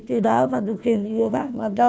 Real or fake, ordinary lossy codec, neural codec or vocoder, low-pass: fake; none; codec, 16 kHz, 1 kbps, FunCodec, trained on Chinese and English, 50 frames a second; none